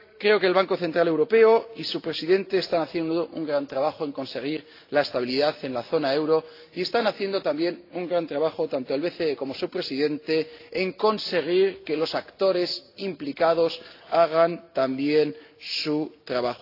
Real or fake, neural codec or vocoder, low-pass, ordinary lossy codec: real; none; 5.4 kHz; AAC, 32 kbps